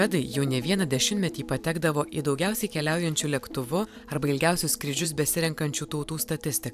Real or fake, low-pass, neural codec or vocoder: fake; 14.4 kHz; vocoder, 44.1 kHz, 128 mel bands every 512 samples, BigVGAN v2